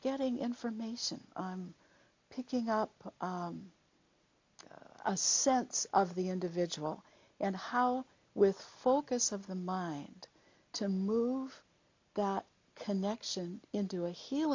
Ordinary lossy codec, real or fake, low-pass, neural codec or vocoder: MP3, 48 kbps; real; 7.2 kHz; none